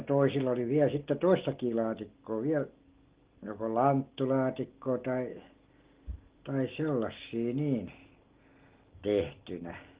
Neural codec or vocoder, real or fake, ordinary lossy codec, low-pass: none; real; Opus, 16 kbps; 3.6 kHz